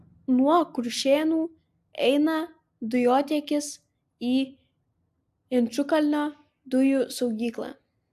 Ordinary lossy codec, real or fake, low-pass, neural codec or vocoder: AAC, 96 kbps; real; 14.4 kHz; none